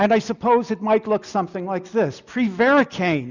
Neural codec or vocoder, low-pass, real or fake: none; 7.2 kHz; real